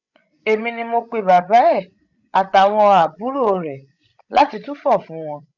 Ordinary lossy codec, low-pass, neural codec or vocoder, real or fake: none; 7.2 kHz; codec, 16 kHz, 16 kbps, FreqCodec, larger model; fake